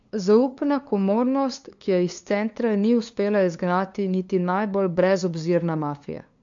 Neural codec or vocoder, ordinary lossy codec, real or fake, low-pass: codec, 16 kHz, 2 kbps, FunCodec, trained on LibriTTS, 25 frames a second; AAC, 64 kbps; fake; 7.2 kHz